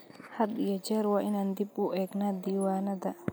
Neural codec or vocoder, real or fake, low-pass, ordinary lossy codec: none; real; none; none